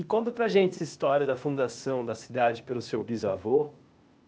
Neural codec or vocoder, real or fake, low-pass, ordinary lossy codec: codec, 16 kHz, 0.8 kbps, ZipCodec; fake; none; none